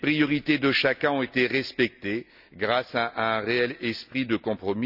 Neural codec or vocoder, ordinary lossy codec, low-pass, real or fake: none; none; 5.4 kHz; real